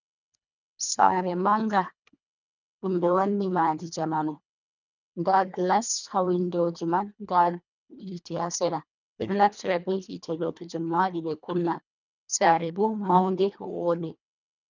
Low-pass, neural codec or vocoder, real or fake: 7.2 kHz; codec, 24 kHz, 1.5 kbps, HILCodec; fake